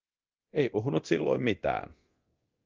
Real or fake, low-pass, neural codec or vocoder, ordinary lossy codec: fake; 7.2 kHz; codec, 24 kHz, 0.9 kbps, DualCodec; Opus, 24 kbps